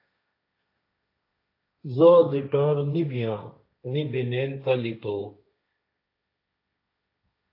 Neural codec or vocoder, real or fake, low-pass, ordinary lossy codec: codec, 16 kHz, 1.1 kbps, Voila-Tokenizer; fake; 5.4 kHz; AAC, 32 kbps